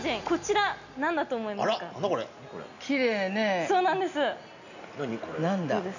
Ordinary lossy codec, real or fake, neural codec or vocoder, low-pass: none; real; none; 7.2 kHz